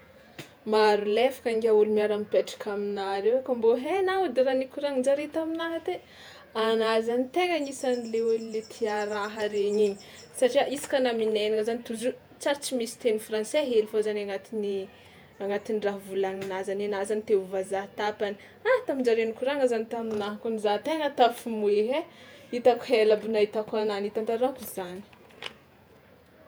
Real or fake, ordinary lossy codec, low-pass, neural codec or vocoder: fake; none; none; vocoder, 48 kHz, 128 mel bands, Vocos